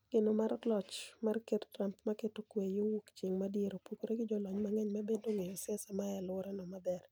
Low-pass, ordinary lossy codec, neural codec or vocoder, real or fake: none; none; none; real